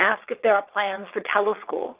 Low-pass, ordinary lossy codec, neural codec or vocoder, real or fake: 3.6 kHz; Opus, 32 kbps; vocoder, 22.05 kHz, 80 mel bands, Vocos; fake